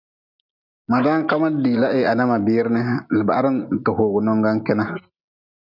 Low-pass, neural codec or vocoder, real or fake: 5.4 kHz; none; real